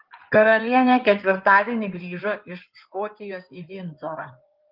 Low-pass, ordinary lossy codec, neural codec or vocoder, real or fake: 5.4 kHz; Opus, 32 kbps; codec, 16 kHz in and 24 kHz out, 2.2 kbps, FireRedTTS-2 codec; fake